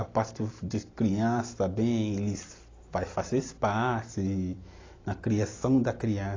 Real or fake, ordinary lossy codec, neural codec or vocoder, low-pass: real; AAC, 32 kbps; none; 7.2 kHz